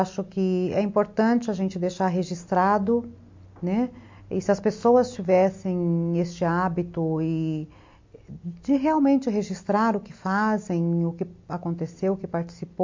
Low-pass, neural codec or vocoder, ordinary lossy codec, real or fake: 7.2 kHz; none; MP3, 48 kbps; real